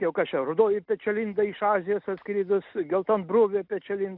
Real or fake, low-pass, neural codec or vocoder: real; 5.4 kHz; none